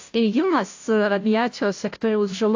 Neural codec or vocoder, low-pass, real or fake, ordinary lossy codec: codec, 16 kHz, 0.5 kbps, FunCodec, trained on Chinese and English, 25 frames a second; 7.2 kHz; fake; AAC, 48 kbps